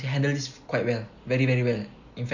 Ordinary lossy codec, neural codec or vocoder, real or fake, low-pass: none; none; real; 7.2 kHz